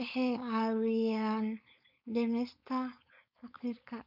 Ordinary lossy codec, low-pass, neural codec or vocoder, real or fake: MP3, 48 kbps; 5.4 kHz; codec, 16 kHz, 4.8 kbps, FACodec; fake